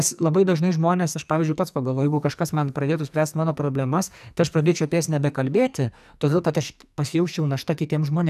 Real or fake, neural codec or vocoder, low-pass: fake; codec, 44.1 kHz, 2.6 kbps, SNAC; 14.4 kHz